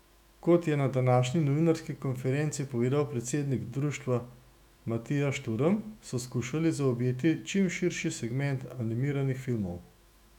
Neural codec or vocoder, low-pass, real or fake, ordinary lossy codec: autoencoder, 48 kHz, 128 numbers a frame, DAC-VAE, trained on Japanese speech; 19.8 kHz; fake; none